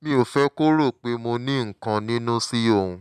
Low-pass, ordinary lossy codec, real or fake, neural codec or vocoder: 14.4 kHz; none; real; none